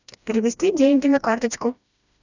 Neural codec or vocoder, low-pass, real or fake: codec, 16 kHz, 1 kbps, FreqCodec, smaller model; 7.2 kHz; fake